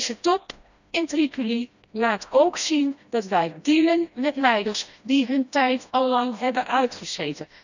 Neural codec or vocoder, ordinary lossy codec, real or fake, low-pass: codec, 16 kHz, 1 kbps, FreqCodec, smaller model; none; fake; 7.2 kHz